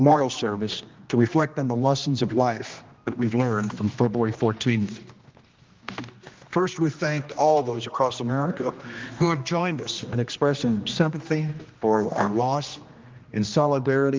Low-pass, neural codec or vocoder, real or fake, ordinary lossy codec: 7.2 kHz; codec, 16 kHz, 1 kbps, X-Codec, HuBERT features, trained on general audio; fake; Opus, 24 kbps